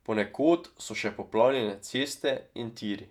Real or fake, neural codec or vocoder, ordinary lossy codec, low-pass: fake; vocoder, 48 kHz, 128 mel bands, Vocos; none; 19.8 kHz